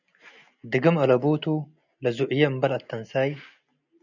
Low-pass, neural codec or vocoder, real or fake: 7.2 kHz; none; real